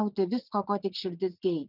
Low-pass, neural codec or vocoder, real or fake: 5.4 kHz; vocoder, 44.1 kHz, 80 mel bands, Vocos; fake